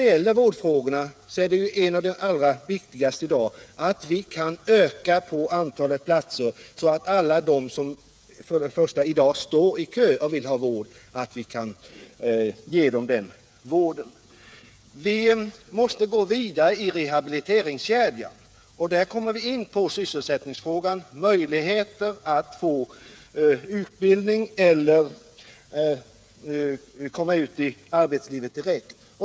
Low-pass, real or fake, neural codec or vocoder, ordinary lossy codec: none; fake; codec, 16 kHz, 8 kbps, FreqCodec, smaller model; none